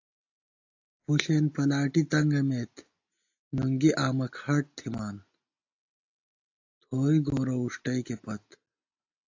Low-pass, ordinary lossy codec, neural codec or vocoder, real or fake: 7.2 kHz; AAC, 48 kbps; none; real